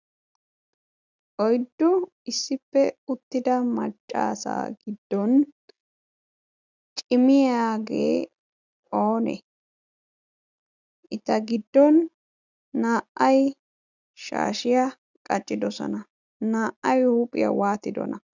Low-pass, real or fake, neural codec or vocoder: 7.2 kHz; real; none